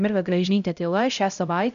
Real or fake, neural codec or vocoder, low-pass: fake; codec, 16 kHz, 0.5 kbps, X-Codec, HuBERT features, trained on LibriSpeech; 7.2 kHz